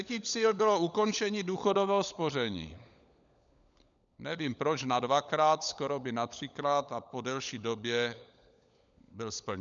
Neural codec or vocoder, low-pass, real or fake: codec, 16 kHz, 16 kbps, FunCodec, trained on LibriTTS, 50 frames a second; 7.2 kHz; fake